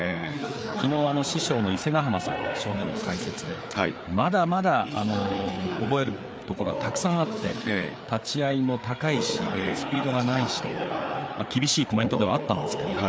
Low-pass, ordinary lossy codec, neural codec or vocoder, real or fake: none; none; codec, 16 kHz, 4 kbps, FreqCodec, larger model; fake